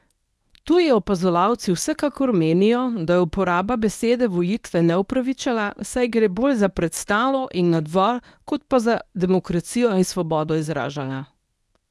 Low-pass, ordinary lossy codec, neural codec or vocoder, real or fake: none; none; codec, 24 kHz, 0.9 kbps, WavTokenizer, medium speech release version 1; fake